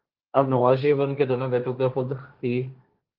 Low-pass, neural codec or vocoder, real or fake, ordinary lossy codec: 5.4 kHz; codec, 16 kHz, 1.1 kbps, Voila-Tokenizer; fake; Opus, 24 kbps